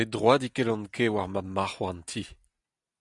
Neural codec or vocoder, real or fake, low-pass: none; real; 10.8 kHz